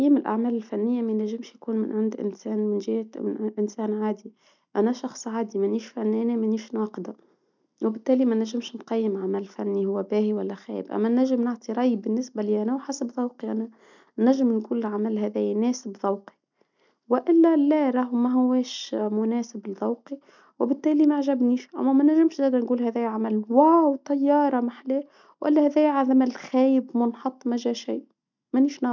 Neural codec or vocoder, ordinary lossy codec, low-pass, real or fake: none; none; 7.2 kHz; real